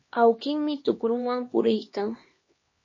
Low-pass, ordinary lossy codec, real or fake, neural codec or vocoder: 7.2 kHz; MP3, 32 kbps; fake; codec, 16 kHz, 2 kbps, X-Codec, HuBERT features, trained on LibriSpeech